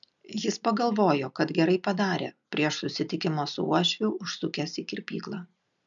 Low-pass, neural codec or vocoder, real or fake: 7.2 kHz; none; real